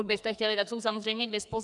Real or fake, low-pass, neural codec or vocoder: fake; 10.8 kHz; codec, 44.1 kHz, 2.6 kbps, SNAC